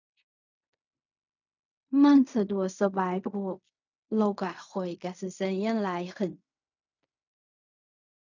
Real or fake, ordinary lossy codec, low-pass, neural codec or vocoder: fake; none; 7.2 kHz; codec, 16 kHz in and 24 kHz out, 0.4 kbps, LongCat-Audio-Codec, fine tuned four codebook decoder